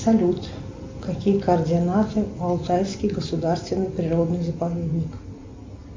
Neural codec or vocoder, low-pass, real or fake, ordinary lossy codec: none; 7.2 kHz; real; MP3, 48 kbps